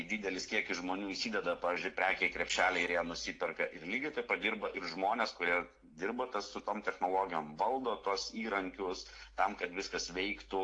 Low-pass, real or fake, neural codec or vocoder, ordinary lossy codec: 9.9 kHz; real; none; AAC, 48 kbps